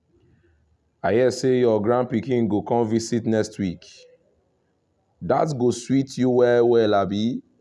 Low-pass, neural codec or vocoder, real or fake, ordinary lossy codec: none; none; real; none